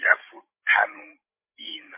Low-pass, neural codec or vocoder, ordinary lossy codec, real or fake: 3.6 kHz; codec, 16 kHz, 16 kbps, FreqCodec, larger model; MP3, 24 kbps; fake